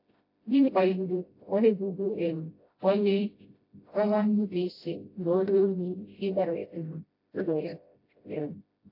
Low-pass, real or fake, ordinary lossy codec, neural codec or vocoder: 5.4 kHz; fake; AAC, 32 kbps; codec, 16 kHz, 0.5 kbps, FreqCodec, smaller model